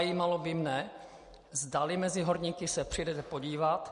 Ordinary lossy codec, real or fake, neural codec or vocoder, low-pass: MP3, 48 kbps; real; none; 10.8 kHz